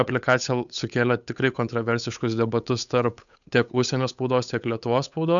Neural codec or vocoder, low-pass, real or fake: codec, 16 kHz, 8 kbps, FunCodec, trained on Chinese and English, 25 frames a second; 7.2 kHz; fake